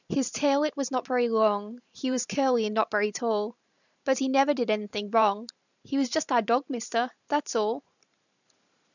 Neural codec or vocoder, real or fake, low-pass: vocoder, 44.1 kHz, 128 mel bands every 512 samples, BigVGAN v2; fake; 7.2 kHz